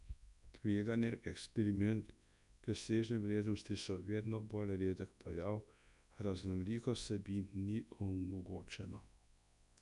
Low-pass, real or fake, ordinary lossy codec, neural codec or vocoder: 10.8 kHz; fake; none; codec, 24 kHz, 0.9 kbps, WavTokenizer, large speech release